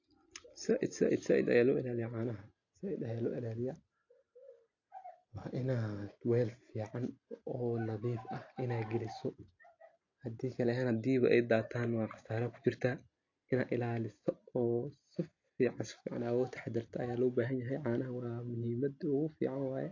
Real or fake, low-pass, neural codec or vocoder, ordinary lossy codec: real; 7.2 kHz; none; AAC, 48 kbps